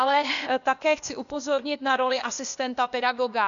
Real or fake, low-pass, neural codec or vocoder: fake; 7.2 kHz; codec, 16 kHz, 0.8 kbps, ZipCodec